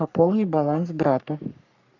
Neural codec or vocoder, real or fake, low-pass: codec, 44.1 kHz, 3.4 kbps, Pupu-Codec; fake; 7.2 kHz